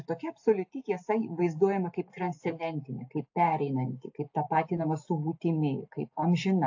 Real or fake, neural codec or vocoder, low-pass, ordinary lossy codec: real; none; 7.2 kHz; AAC, 48 kbps